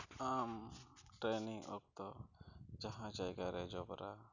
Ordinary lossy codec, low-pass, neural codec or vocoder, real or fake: none; 7.2 kHz; none; real